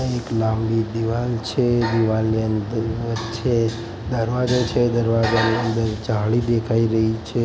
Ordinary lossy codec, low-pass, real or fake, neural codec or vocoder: none; none; real; none